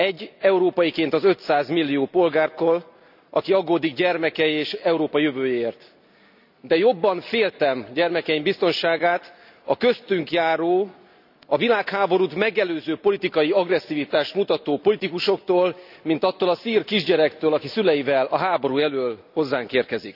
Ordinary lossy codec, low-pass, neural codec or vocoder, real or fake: none; 5.4 kHz; none; real